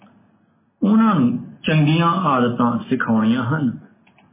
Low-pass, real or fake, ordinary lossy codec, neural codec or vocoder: 3.6 kHz; real; MP3, 16 kbps; none